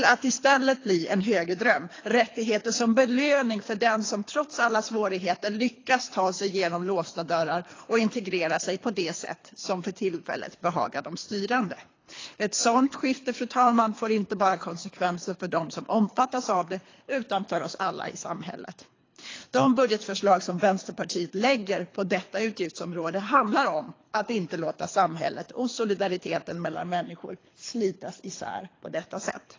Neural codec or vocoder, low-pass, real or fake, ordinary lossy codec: codec, 24 kHz, 3 kbps, HILCodec; 7.2 kHz; fake; AAC, 32 kbps